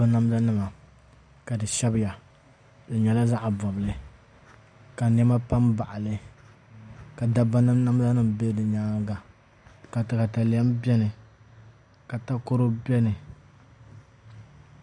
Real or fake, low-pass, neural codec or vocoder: real; 9.9 kHz; none